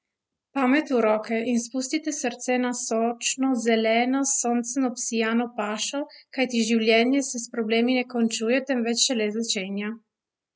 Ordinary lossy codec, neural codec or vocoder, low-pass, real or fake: none; none; none; real